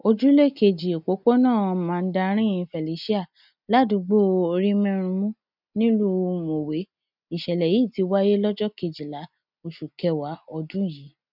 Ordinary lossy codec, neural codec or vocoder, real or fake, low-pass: none; none; real; 5.4 kHz